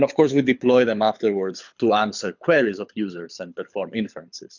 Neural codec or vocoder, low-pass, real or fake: codec, 24 kHz, 6 kbps, HILCodec; 7.2 kHz; fake